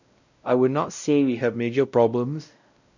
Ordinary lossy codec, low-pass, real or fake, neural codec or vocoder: none; 7.2 kHz; fake; codec, 16 kHz, 0.5 kbps, X-Codec, WavLM features, trained on Multilingual LibriSpeech